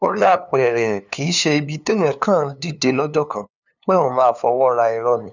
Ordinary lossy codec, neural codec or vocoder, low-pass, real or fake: none; codec, 16 kHz, 2 kbps, FunCodec, trained on LibriTTS, 25 frames a second; 7.2 kHz; fake